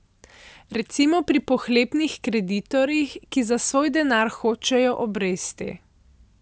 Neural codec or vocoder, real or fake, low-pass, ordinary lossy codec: none; real; none; none